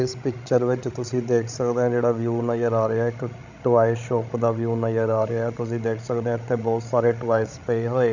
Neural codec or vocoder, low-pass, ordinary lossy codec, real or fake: codec, 16 kHz, 16 kbps, FreqCodec, larger model; 7.2 kHz; none; fake